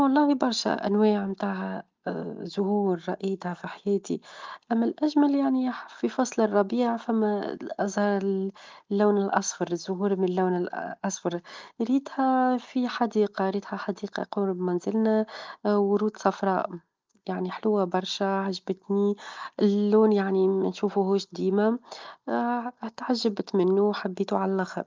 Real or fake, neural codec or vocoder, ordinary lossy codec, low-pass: real; none; Opus, 32 kbps; 7.2 kHz